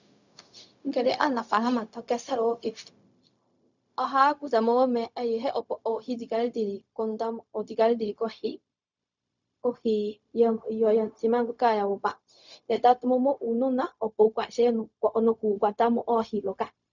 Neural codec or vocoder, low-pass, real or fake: codec, 16 kHz, 0.4 kbps, LongCat-Audio-Codec; 7.2 kHz; fake